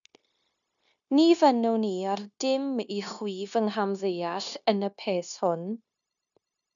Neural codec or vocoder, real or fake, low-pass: codec, 16 kHz, 0.9 kbps, LongCat-Audio-Codec; fake; 7.2 kHz